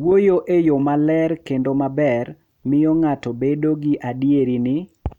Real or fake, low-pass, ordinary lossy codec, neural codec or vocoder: real; 19.8 kHz; none; none